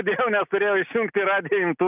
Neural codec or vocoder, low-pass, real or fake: none; 3.6 kHz; real